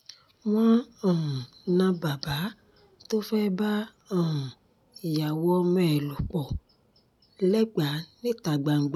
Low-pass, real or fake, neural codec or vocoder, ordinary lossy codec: 19.8 kHz; real; none; none